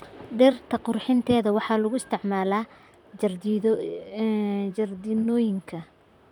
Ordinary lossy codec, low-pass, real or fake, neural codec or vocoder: none; 19.8 kHz; fake; vocoder, 44.1 kHz, 128 mel bands, Pupu-Vocoder